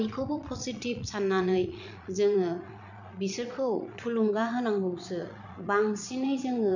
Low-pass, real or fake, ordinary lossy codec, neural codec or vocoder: 7.2 kHz; fake; none; vocoder, 22.05 kHz, 80 mel bands, Vocos